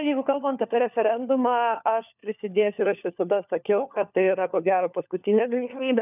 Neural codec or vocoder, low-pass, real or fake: codec, 16 kHz, 4 kbps, FunCodec, trained on LibriTTS, 50 frames a second; 3.6 kHz; fake